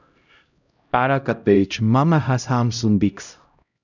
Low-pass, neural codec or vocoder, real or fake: 7.2 kHz; codec, 16 kHz, 0.5 kbps, X-Codec, HuBERT features, trained on LibriSpeech; fake